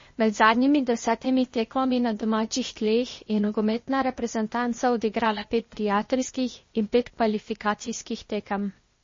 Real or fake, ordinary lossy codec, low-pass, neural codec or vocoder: fake; MP3, 32 kbps; 7.2 kHz; codec, 16 kHz, 0.8 kbps, ZipCodec